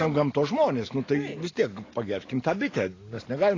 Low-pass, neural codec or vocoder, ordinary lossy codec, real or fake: 7.2 kHz; none; AAC, 32 kbps; real